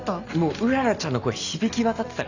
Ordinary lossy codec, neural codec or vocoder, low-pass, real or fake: none; none; 7.2 kHz; real